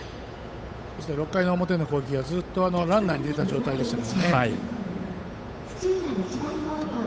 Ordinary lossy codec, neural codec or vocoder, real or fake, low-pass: none; codec, 16 kHz, 8 kbps, FunCodec, trained on Chinese and English, 25 frames a second; fake; none